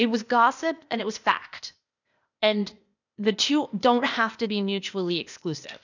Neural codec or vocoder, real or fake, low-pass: codec, 16 kHz, 0.8 kbps, ZipCodec; fake; 7.2 kHz